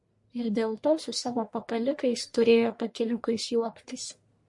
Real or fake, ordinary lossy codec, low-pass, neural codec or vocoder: fake; MP3, 48 kbps; 10.8 kHz; codec, 44.1 kHz, 1.7 kbps, Pupu-Codec